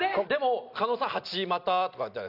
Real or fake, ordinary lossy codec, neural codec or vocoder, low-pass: real; none; none; 5.4 kHz